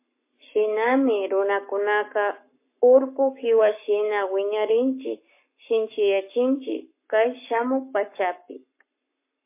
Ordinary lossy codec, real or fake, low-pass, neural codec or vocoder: MP3, 24 kbps; fake; 3.6 kHz; codec, 44.1 kHz, 7.8 kbps, Pupu-Codec